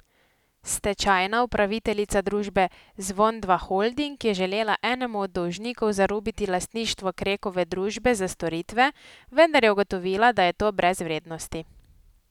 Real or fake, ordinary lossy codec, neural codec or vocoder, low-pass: real; none; none; 19.8 kHz